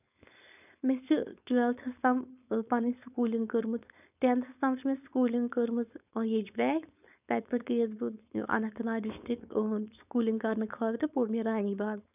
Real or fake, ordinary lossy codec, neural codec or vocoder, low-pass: fake; none; codec, 16 kHz, 4.8 kbps, FACodec; 3.6 kHz